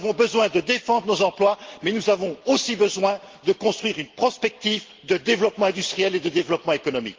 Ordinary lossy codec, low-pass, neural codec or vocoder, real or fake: Opus, 16 kbps; 7.2 kHz; none; real